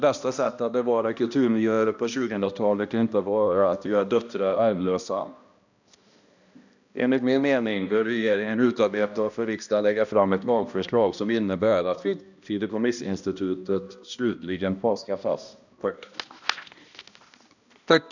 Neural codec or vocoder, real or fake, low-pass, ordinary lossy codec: codec, 16 kHz, 1 kbps, X-Codec, HuBERT features, trained on balanced general audio; fake; 7.2 kHz; none